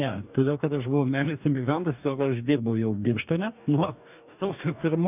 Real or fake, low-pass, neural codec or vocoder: fake; 3.6 kHz; codec, 44.1 kHz, 2.6 kbps, DAC